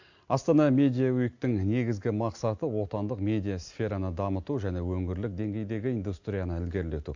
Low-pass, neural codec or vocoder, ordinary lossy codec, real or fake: 7.2 kHz; none; AAC, 48 kbps; real